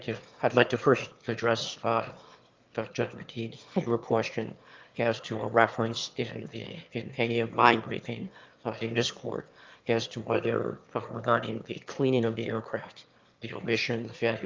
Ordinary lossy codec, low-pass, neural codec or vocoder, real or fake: Opus, 32 kbps; 7.2 kHz; autoencoder, 22.05 kHz, a latent of 192 numbers a frame, VITS, trained on one speaker; fake